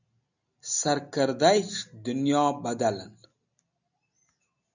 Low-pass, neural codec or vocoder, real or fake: 7.2 kHz; none; real